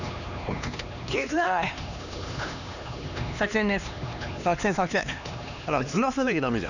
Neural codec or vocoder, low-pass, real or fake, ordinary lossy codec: codec, 16 kHz, 2 kbps, X-Codec, HuBERT features, trained on LibriSpeech; 7.2 kHz; fake; none